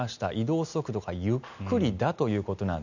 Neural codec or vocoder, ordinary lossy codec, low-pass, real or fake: none; none; 7.2 kHz; real